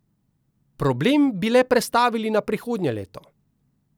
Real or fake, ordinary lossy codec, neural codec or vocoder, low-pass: real; none; none; none